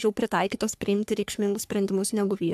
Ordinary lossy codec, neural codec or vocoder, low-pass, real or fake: AAC, 96 kbps; codec, 44.1 kHz, 3.4 kbps, Pupu-Codec; 14.4 kHz; fake